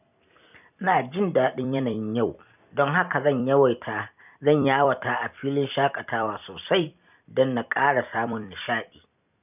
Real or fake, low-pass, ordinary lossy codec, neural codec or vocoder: fake; 3.6 kHz; none; vocoder, 44.1 kHz, 128 mel bands every 256 samples, BigVGAN v2